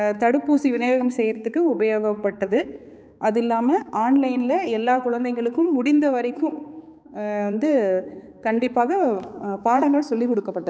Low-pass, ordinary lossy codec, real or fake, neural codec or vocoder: none; none; fake; codec, 16 kHz, 4 kbps, X-Codec, HuBERT features, trained on balanced general audio